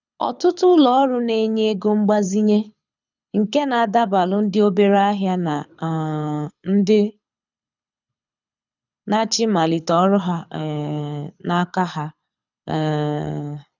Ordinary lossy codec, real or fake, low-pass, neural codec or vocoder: none; fake; 7.2 kHz; codec, 24 kHz, 6 kbps, HILCodec